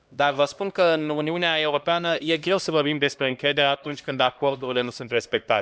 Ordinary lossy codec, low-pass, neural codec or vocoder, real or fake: none; none; codec, 16 kHz, 1 kbps, X-Codec, HuBERT features, trained on LibriSpeech; fake